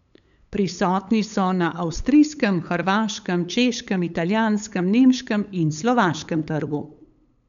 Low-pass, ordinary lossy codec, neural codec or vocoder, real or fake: 7.2 kHz; none; codec, 16 kHz, 8 kbps, FunCodec, trained on LibriTTS, 25 frames a second; fake